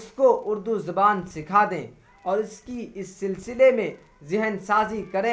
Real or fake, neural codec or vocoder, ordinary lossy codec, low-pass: real; none; none; none